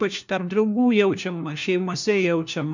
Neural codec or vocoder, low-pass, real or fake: codec, 16 kHz, 1 kbps, FunCodec, trained on LibriTTS, 50 frames a second; 7.2 kHz; fake